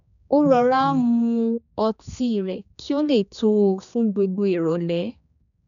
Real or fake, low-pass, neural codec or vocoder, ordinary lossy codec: fake; 7.2 kHz; codec, 16 kHz, 2 kbps, X-Codec, HuBERT features, trained on general audio; none